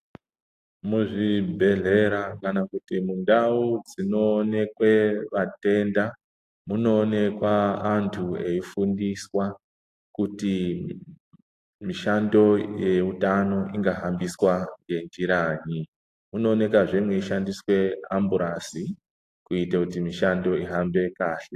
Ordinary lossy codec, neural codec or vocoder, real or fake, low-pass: AAC, 64 kbps; none; real; 14.4 kHz